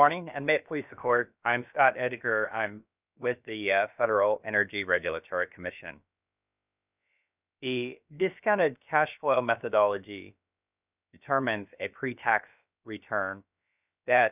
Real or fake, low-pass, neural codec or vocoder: fake; 3.6 kHz; codec, 16 kHz, about 1 kbps, DyCAST, with the encoder's durations